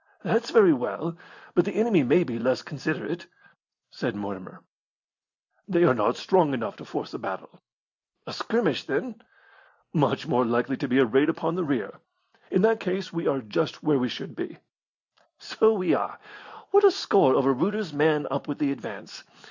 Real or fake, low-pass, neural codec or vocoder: real; 7.2 kHz; none